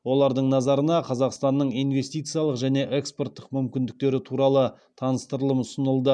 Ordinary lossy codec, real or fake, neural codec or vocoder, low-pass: none; real; none; 9.9 kHz